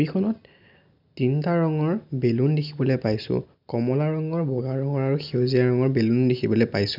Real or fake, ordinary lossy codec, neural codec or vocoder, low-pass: real; none; none; 5.4 kHz